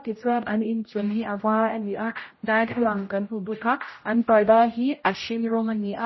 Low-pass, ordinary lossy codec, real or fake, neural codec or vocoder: 7.2 kHz; MP3, 24 kbps; fake; codec, 16 kHz, 0.5 kbps, X-Codec, HuBERT features, trained on general audio